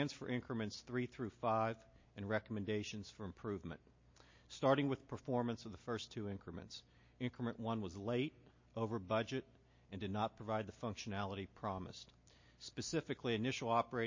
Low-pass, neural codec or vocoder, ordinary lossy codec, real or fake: 7.2 kHz; none; MP3, 32 kbps; real